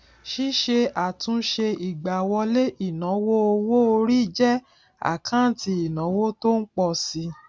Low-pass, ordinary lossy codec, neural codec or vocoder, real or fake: none; none; none; real